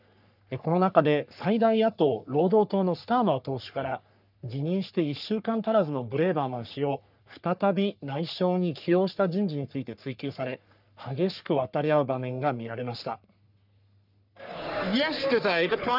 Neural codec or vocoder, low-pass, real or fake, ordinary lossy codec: codec, 44.1 kHz, 3.4 kbps, Pupu-Codec; 5.4 kHz; fake; none